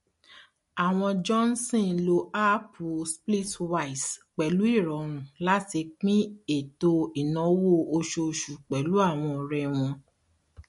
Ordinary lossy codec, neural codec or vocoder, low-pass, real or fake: MP3, 48 kbps; none; 14.4 kHz; real